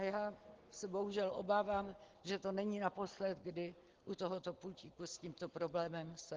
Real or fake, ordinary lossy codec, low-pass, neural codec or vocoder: real; Opus, 16 kbps; 7.2 kHz; none